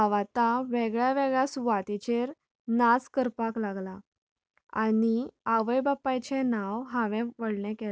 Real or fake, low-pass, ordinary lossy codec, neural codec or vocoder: real; none; none; none